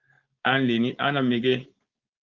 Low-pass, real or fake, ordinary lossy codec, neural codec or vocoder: 7.2 kHz; fake; Opus, 24 kbps; codec, 16 kHz, 4.8 kbps, FACodec